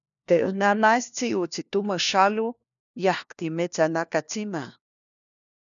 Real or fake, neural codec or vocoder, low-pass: fake; codec, 16 kHz, 1 kbps, FunCodec, trained on LibriTTS, 50 frames a second; 7.2 kHz